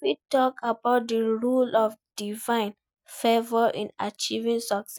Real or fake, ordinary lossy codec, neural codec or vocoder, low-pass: real; none; none; none